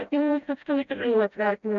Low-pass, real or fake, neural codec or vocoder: 7.2 kHz; fake; codec, 16 kHz, 0.5 kbps, FreqCodec, smaller model